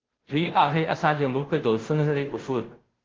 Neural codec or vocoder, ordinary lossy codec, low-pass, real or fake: codec, 16 kHz, 0.5 kbps, FunCodec, trained on Chinese and English, 25 frames a second; Opus, 16 kbps; 7.2 kHz; fake